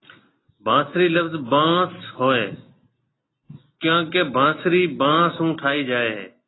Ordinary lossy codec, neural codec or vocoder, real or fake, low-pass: AAC, 16 kbps; none; real; 7.2 kHz